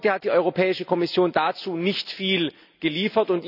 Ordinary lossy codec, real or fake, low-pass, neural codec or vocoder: none; real; 5.4 kHz; none